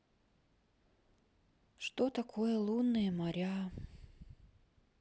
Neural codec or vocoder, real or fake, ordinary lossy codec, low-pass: none; real; none; none